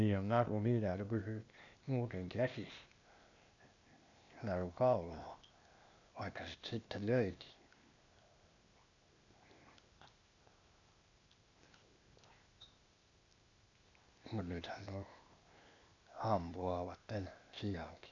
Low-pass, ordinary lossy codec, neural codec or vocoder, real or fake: 7.2 kHz; none; codec, 16 kHz, 0.8 kbps, ZipCodec; fake